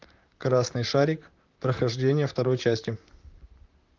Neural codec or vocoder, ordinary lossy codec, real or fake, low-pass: vocoder, 44.1 kHz, 128 mel bands, Pupu-Vocoder; Opus, 24 kbps; fake; 7.2 kHz